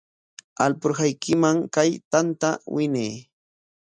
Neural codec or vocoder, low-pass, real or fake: none; 9.9 kHz; real